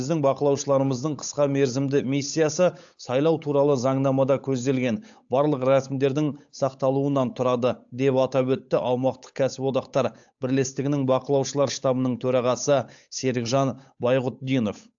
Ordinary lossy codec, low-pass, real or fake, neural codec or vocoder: AAC, 64 kbps; 7.2 kHz; fake; codec, 16 kHz, 8 kbps, FunCodec, trained on Chinese and English, 25 frames a second